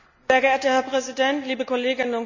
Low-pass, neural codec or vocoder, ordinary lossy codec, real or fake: 7.2 kHz; none; none; real